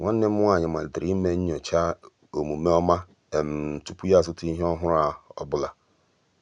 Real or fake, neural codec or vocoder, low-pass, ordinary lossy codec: real; none; 9.9 kHz; none